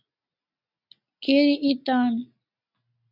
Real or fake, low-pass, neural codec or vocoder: real; 5.4 kHz; none